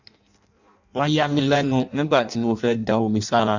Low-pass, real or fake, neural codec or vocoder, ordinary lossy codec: 7.2 kHz; fake; codec, 16 kHz in and 24 kHz out, 0.6 kbps, FireRedTTS-2 codec; none